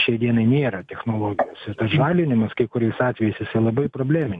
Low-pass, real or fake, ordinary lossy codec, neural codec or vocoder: 10.8 kHz; real; MP3, 64 kbps; none